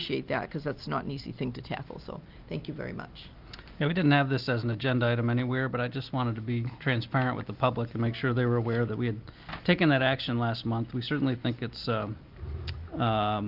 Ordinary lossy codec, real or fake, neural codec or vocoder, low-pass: Opus, 24 kbps; real; none; 5.4 kHz